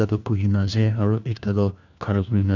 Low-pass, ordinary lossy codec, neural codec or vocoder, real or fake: 7.2 kHz; none; codec, 16 kHz, 1 kbps, FunCodec, trained on LibriTTS, 50 frames a second; fake